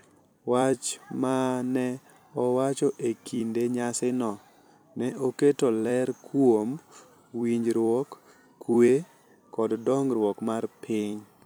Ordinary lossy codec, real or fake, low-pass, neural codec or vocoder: none; fake; none; vocoder, 44.1 kHz, 128 mel bands every 256 samples, BigVGAN v2